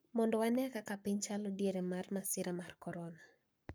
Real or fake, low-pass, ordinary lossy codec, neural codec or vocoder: fake; none; none; codec, 44.1 kHz, 7.8 kbps, Pupu-Codec